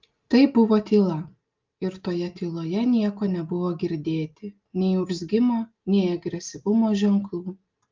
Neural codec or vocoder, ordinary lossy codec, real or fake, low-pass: none; Opus, 24 kbps; real; 7.2 kHz